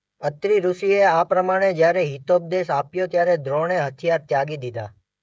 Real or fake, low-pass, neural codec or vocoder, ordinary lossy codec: fake; none; codec, 16 kHz, 16 kbps, FreqCodec, smaller model; none